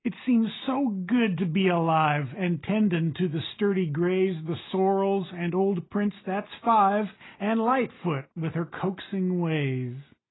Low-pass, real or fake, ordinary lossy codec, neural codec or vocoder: 7.2 kHz; real; AAC, 16 kbps; none